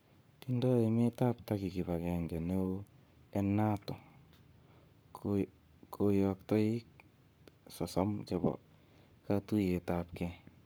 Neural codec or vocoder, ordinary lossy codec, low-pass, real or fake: codec, 44.1 kHz, 7.8 kbps, Pupu-Codec; none; none; fake